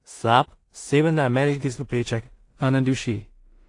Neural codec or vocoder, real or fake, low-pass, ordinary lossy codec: codec, 16 kHz in and 24 kHz out, 0.4 kbps, LongCat-Audio-Codec, two codebook decoder; fake; 10.8 kHz; AAC, 48 kbps